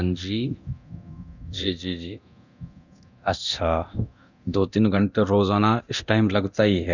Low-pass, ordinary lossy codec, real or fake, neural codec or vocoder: 7.2 kHz; none; fake; codec, 24 kHz, 0.9 kbps, DualCodec